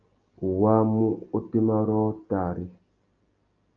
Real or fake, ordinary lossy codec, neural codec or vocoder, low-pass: real; Opus, 24 kbps; none; 7.2 kHz